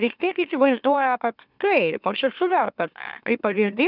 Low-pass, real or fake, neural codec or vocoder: 5.4 kHz; fake; autoencoder, 44.1 kHz, a latent of 192 numbers a frame, MeloTTS